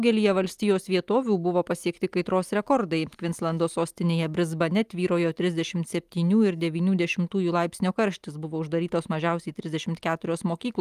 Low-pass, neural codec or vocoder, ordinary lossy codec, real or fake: 14.4 kHz; none; Opus, 32 kbps; real